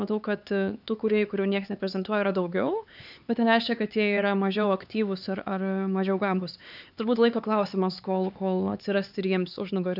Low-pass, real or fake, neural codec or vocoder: 5.4 kHz; fake; codec, 16 kHz, 4 kbps, X-Codec, HuBERT features, trained on LibriSpeech